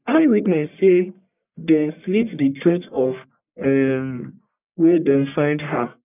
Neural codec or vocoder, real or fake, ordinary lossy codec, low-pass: codec, 44.1 kHz, 1.7 kbps, Pupu-Codec; fake; none; 3.6 kHz